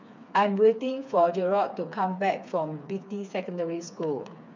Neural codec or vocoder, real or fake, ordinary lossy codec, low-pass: codec, 16 kHz, 4 kbps, FreqCodec, smaller model; fake; MP3, 64 kbps; 7.2 kHz